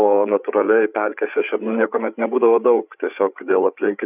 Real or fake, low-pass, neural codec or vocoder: fake; 3.6 kHz; codec, 16 kHz, 8 kbps, FreqCodec, larger model